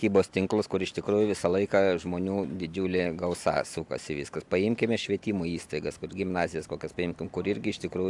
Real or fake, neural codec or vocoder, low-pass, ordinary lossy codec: real; none; 10.8 kHz; AAC, 64 kbps